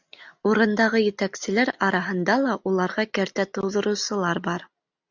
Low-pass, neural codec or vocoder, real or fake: 7.2 kHz; none; real